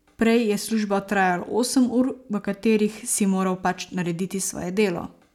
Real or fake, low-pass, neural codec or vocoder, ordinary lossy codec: real; 19.8 kHz; none; none